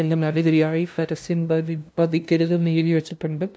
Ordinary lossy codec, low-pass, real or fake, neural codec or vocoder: none; none; fake; codec, 16 kHz, 0.5 kbps, FunCodec, trained on LibriTTS, 25 frames a second